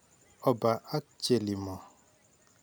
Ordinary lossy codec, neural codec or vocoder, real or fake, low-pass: none; none; real; none